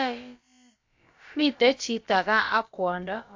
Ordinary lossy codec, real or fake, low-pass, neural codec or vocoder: AAC, 48 kbps; fake; 7.2 kHz; codec, 16 kHz, about 1 kbps, DyCAST, with the encoder's durations